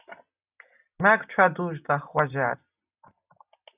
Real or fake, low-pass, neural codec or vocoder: real; 3.6 kHz; none